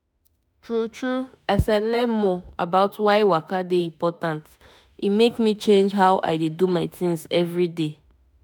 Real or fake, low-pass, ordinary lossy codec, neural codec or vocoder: fake; none; none; autoencoder, 48 kHz, 32 numbers a frame, DAC-VAE, trained on Japanese speech